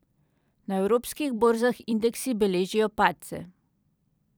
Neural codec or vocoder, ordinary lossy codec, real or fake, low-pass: vocoder, 44.1 kHz, 128 mel bands every 256 samples, BigVGAN v2; none; fake; none